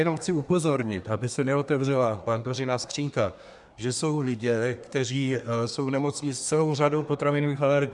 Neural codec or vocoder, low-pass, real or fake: codec, 24 kHz, 1 kbps, SNAC; 10.8 kHz; fake